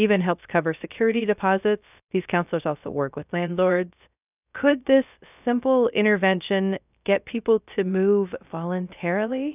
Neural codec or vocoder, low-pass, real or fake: codec, 16 kHz, 0.3 kbps, FocalCodec; 3.6 kHz; fake